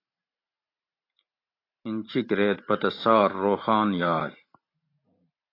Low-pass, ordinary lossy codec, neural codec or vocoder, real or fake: 5.4 kHz; AAC, 32 kbps; vocoder, 44.1 kHz, 128 mel bands every 512 samples, BigVGAN v2; fake